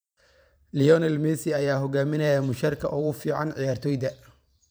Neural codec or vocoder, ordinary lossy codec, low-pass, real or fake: vocoder, 44.1 kHz, 128 mel bands every 512 samples, BigVGAN v2; none; none; fake